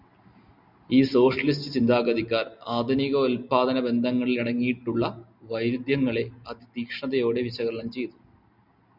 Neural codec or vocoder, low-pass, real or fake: none; 5.4 kHz; real